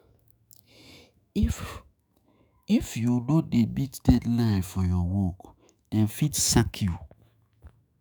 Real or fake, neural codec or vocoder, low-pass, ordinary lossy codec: fake; autoencoder, 48 kHz, 128 numbers a frame, DAC-VAE, trained on Japanese speech; none; none